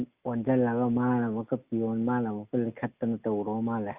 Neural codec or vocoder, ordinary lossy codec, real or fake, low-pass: none; Opus, 64 kbps; real; 3.6 kHz